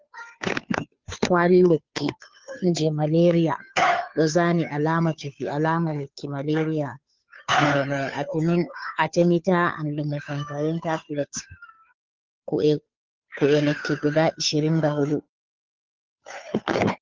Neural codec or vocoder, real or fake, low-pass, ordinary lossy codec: codec, 16 kHz, 2 kbps, FunCodec, trained on Chinese and English, 25 frames a second; fake; 7.2 kHz; Opus, 32 kbps